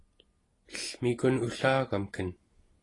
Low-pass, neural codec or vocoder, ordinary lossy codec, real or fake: 10.8 kHz; none; AAC, 32 kbps; real